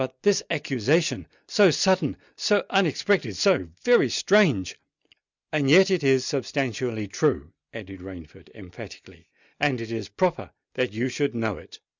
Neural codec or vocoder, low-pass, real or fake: none; 7.2 kHz; real